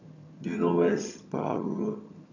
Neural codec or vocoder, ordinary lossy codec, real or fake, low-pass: vocoder, 22.05 kHz, 80 mel bands, HiFi-GAN; none; fake; 7.2 kHz